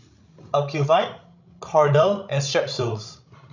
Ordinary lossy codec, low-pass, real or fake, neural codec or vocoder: none; 7.2 kHz; fake; codec, 16 kHz, 16 kbps, FreqCodec, larger model